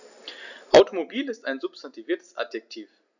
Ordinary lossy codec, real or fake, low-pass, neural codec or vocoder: none; real; 7.2 kHz; none